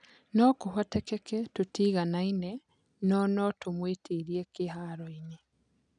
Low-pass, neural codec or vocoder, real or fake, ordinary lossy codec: 9.9 kHz; none; real; none